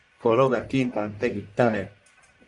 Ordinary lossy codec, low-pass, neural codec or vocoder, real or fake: Opus, 64 kbps; 10.8 kHz; codec, 44.1 kHz, 1.7 kbps, Pupu-Codec; fake